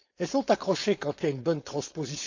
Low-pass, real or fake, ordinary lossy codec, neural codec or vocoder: 7.2 kHz; fake; AAC, 48 kbps; codec, 16 kHz, 4.8 kbps, FACodec